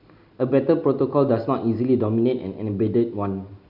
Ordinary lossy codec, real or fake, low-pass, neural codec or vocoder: Opus, 64 kbps; real; 5.4 kHz; none